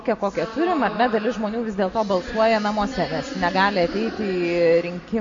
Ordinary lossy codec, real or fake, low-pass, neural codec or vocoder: MP3, 48 kbps; real; 7.2 kHz; none